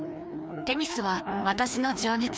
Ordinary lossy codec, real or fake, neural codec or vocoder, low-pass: none; fake; codec, 16 kHz, 2 kbps, FreqCodec, larger model; none